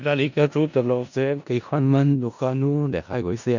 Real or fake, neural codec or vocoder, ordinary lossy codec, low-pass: fake; codec, 16 kHz in and 24 kHz out, 0.4 kbps, LongCat-Audio-Codec, four codebook decoder; none; 7.2 kHz